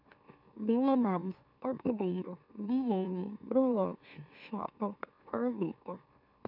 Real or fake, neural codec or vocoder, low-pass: fake; autoencoder, 44.1 kHz, a latent of 192 numbers a frame, MeloTTS; 5.4 kHz